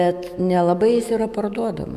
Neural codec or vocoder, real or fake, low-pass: autoencoder, 48 kHz, 128 numbers a frame, DAC-VAE, trained on Japanese speech; fake; 14.4 kHz